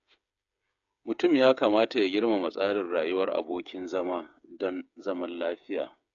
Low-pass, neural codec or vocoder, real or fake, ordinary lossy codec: 7.2 kHz; codec, 16 kHz, 8 kbps, FreqCodec, smaller model; fake; AAC, 64 kbps